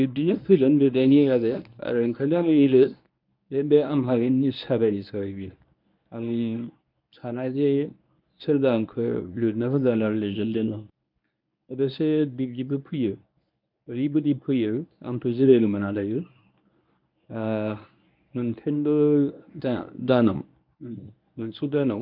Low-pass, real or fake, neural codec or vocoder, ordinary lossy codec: 5.4 kHz; fake; codec, 24 kHz, 0.9 kbps, WavTokenizer, medium speech release version 1; none